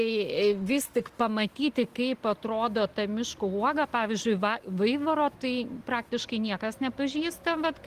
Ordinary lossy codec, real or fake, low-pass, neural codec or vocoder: Opus, 24 kbps; real; 14.4 kHz; none